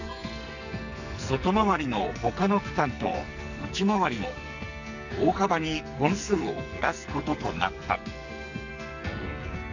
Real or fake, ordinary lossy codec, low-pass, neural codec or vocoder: fake; none; 7.2 kHz; codec, 44.1 kHz, 2.6 kbps, SNAC